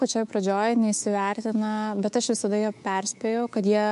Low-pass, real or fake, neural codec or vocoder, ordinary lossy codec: 10.8 kHz; fake; codec, 24 kHz, 3.1 kbps, DualCodec; MP3, 64 kbps